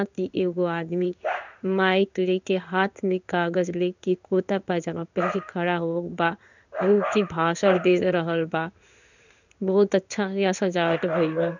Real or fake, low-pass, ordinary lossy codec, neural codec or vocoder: fake; 7.2 kHz; none; codec, 16 kHz in and 24 kHz out, 1 kbps, XY-Tokenizer